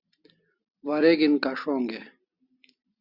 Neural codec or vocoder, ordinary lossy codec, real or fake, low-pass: none; Opus, 64 kbps; real; 5.4 kHz